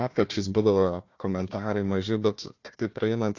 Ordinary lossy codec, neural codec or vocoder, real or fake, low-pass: AAC, 48 kbps; codec, 16 kHz, 1 kbps, FunCodec, trained on Chinese and English, 50 frames a second; fake; 7.2 kHz